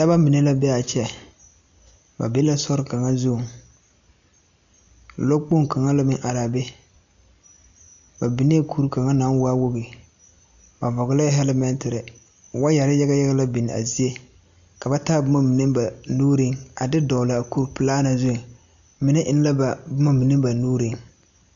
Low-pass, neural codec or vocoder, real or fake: 7.2 kHz; none; real